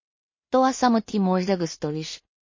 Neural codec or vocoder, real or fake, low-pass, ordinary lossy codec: codec, 16 kHz in and 24 kHz out, 0.4 kbps, LongCat-Audio-Codec, two codebook decoder; fake; 7.2 kHz; MP3, 32 kbps